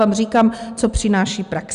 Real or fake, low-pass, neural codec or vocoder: real; 10.8 kHz; none